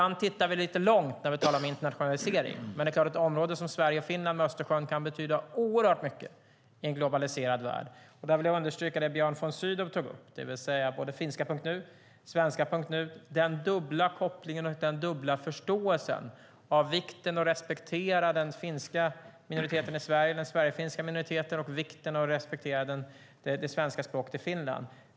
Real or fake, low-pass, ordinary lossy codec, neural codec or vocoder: real; none; none; none